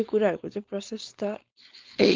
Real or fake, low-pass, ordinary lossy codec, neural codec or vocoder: fake; 7.2 kHz; Opus, 32 kbps; codec, 16 kHz, 4.8 kbps, FACodec